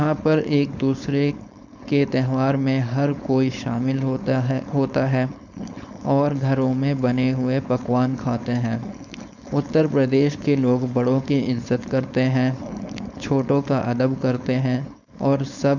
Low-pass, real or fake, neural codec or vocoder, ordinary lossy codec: 7.2 kHz; fake; codec, 16 kHz, 4.8 kbps, FACodec; none